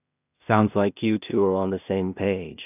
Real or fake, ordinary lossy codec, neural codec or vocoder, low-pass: fake; none; codec, 16 kHz in and 24 kHz out, 0.4 kbps, LongCat-Audio-Codec, two codebook decoder; 3.6 kHz